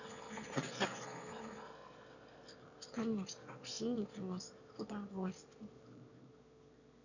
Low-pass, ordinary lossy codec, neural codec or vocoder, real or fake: 7.2 kHz; none; autoencoder, 22.05 kHz, a latent of 192 numbers a frame, VITS, trained on one speaker; fake